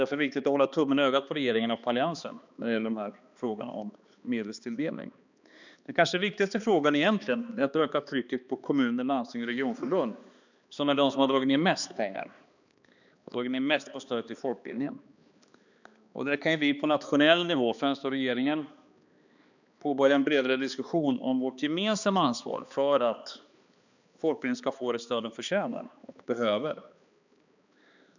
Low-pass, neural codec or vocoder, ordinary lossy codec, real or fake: 7.2 kHz; codec, 16 kHz, 2 kbps, X-Codec, HuBERT features, trained on balanced general audio; none; fake